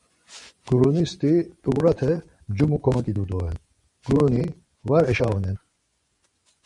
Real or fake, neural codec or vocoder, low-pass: fake; vocoder, 24 kHz, 100 mel bands, Vocos; 10.8 kHz